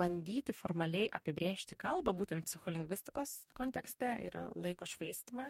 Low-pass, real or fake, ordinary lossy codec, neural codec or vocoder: 19.8 kHz; fake; MP3, 64 kbps; codec, 44.1 kHz, 2.6 kbps, DAC